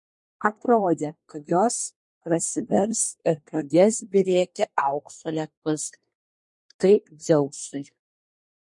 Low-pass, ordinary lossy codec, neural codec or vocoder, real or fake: 10.8 kHz; MP3, 48 kbps; codec, 24 kHz, 1 kbps, SNAC; fake